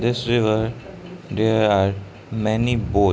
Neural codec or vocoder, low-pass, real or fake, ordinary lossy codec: none; none; real; none